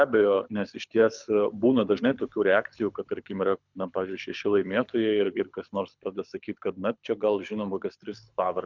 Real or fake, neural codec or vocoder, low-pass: fake; codec, 24 kHz, 6 kbps, HILCodec; 7.2 kHz